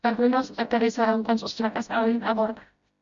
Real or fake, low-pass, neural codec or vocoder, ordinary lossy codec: fake; 7.2 kHz; codec, 16 kHz, 0.5 kbps, FreqCodec, smaller model; Opus, 64 kbps